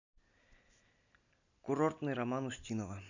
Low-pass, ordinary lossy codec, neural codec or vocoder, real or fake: 7.2 kHz; none; none; real